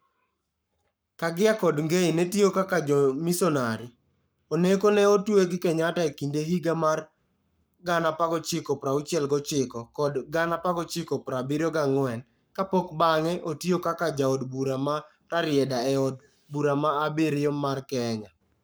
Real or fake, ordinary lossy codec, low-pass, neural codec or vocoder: fake; none; none; codec, 44.1 kHz, 7.8 kbps, Pupu-Codec